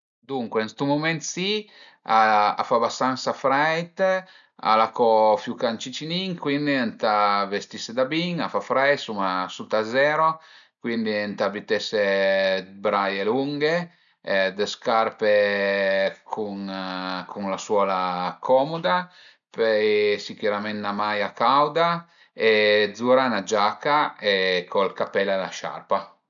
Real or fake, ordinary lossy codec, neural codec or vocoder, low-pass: real; none; none; 7.2 kHz